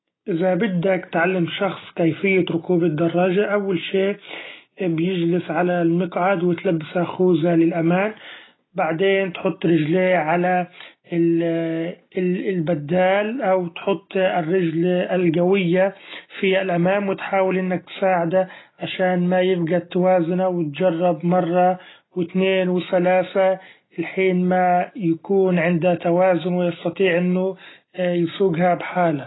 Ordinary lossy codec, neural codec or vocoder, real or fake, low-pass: AAC, 16 kbps; none; real; 7.2 kHz